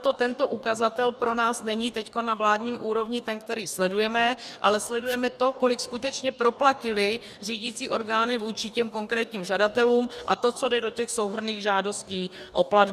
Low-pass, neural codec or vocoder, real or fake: 14.4 kHz; codec, 44.1 kHz, 2.6 kbps, DAC; fake